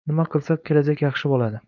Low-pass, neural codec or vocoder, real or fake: 7.2 kHz; codec, 16 kHz, 4.8 kbps, FACodec; fake